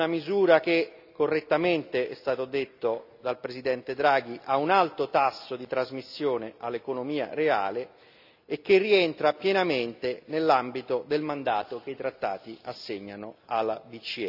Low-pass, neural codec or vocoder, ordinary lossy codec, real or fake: 5.4 kHz; none; none; real